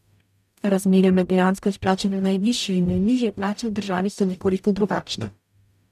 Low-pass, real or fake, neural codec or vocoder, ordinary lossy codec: 14.4 kHz; fake; codec, 44.1 kHz, 0.9 kbps, DAC; none